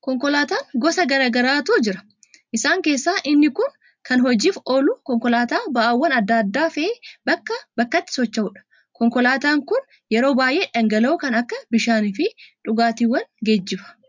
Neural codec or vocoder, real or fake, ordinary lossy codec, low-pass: none; real; MP3, 64 kbps; 7.2 kHz